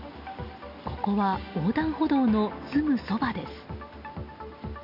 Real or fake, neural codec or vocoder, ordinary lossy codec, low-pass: real; none; none; 5.4 kHz